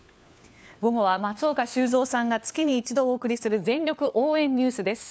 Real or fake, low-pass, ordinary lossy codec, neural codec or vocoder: fake; none; none; codec, 16 kHz, 4 kbps, FunCodec, trained on LibriTTS, 50 frames a second